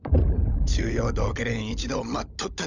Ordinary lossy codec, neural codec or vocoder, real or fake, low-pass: none; codec, 16 kHz, 16 kbps, FunCodec, trained on LibriTTS, 50 frames a second; fake; 7.2 kHz